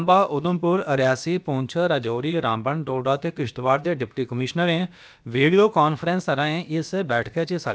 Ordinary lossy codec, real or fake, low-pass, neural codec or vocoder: none; fake; none; codec, 16 kHz, about 1 kbps, DyCAST, with the encoder's durations